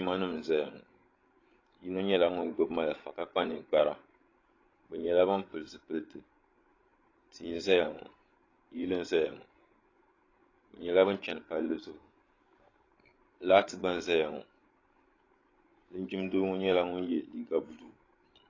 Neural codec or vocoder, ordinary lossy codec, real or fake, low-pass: codec, 16 kHz, 16 kbps, FreqCodec, larger model; AAC, 32 kbps; fake; 7.2 kHz